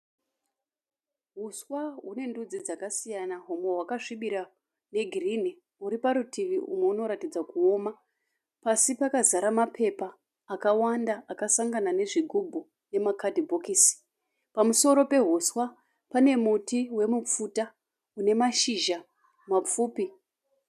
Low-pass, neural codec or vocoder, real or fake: 14.4 kHz; none; real